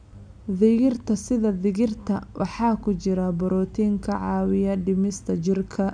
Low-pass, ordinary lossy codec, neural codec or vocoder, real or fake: 9.9 kHz; none; none; real